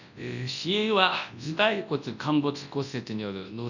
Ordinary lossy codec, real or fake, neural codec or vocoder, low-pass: none; fake; codec, 24 kHz, 0.9 kbps, WavTokenizer, large speech release; 7.2 kHz